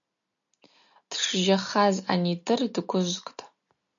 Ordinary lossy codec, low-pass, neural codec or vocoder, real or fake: MP3, 96 kbps; 7.2 kHz; none; real